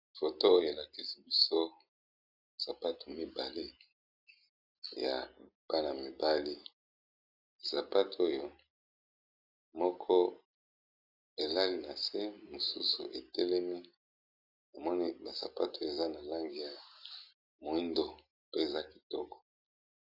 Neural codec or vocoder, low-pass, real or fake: none; 5.4 kHz; real